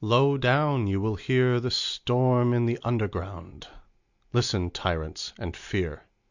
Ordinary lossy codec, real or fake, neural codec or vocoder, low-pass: Opus, 64 kbps; real; none; 7.2 kHz